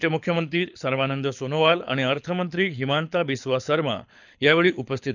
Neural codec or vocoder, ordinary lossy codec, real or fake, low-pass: codec, 24 kHz, 6 kbps, HILCodec; none; fake; 7.2 kHz